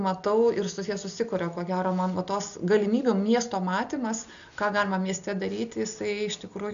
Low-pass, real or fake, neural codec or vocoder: 7.2 kHz; real; none